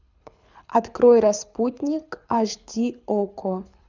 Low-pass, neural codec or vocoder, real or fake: 7.2 kHz; codec, 24 kHz, 6 kbps, HILCodec; fake